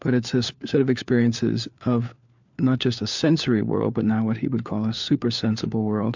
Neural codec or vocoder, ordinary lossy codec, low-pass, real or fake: codec, 16 kHz, 4 kbps, FunCodec, trained on LibriTTS, 50 frames a second; MP3, 64 kbps; 7.2 kHz; fake